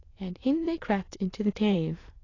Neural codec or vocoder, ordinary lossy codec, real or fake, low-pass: autoencoder, 22.05 kHz, a latent of 192 numbers a frame, VITS, trained on many speakers; AAC, 32 kbps; fake; 7.2 kHz